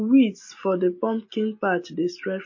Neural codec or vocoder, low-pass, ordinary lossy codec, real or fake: none; 7.2 kHz; MP3, 32 kbps; real